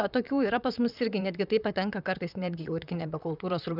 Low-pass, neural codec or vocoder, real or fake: 5.4 kHz; vocoder, 44.1 kHz, 128 mel bands, Pupu-Vocoder; fake